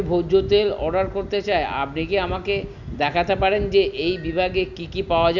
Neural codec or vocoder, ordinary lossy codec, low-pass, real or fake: none; none; 7.2 kHz; real